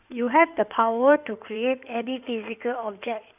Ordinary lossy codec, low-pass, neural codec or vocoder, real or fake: none; 3.6 kHz; codec, 16 kHz in and 24 kHz out, 2.2 kbps, FireRedTTS-2 codec; fake